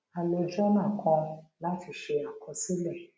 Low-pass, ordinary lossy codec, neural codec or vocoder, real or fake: none; none; none; real